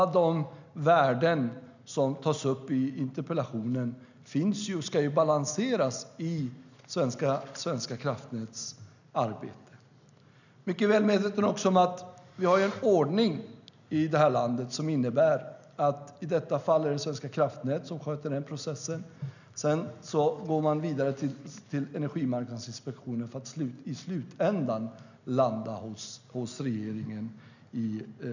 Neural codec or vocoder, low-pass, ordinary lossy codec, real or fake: none; 7.2 kHz; none; real